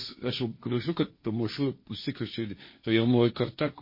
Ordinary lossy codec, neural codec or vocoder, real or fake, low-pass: MP3, 24 kbps; codec, 16 kHz, 1.1 kbps, Voila-Tokenizer; fake; 5.4 kHz